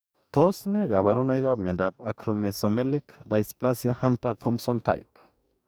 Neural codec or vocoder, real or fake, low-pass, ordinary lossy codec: codec, 44.1 kHz, 2.6 kbps, DAC; fake; none; none